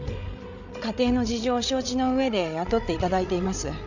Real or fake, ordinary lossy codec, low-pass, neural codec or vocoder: fake; none; 7.2 kHz; codec, 16 kHz, 16 kbps, FreqCodec, larger model